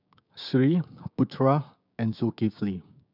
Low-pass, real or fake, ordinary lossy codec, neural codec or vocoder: 5.4 kHz; fake; none; codec, 16 kHz, 6 kbps, DAC